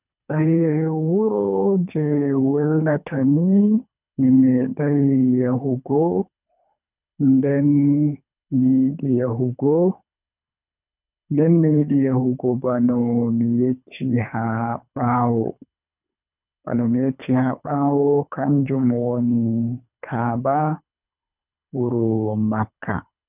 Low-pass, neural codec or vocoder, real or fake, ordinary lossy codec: 3.6 kHz; codec, 24 kHz, 3 kbps, HILCodec; fake; none